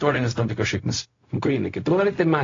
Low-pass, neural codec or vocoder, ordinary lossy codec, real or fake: 7.2 kHz; codec, 16 kHz, 0.4 kbps, LongCat-Audio-Codec; AAC, 32 kbps; fake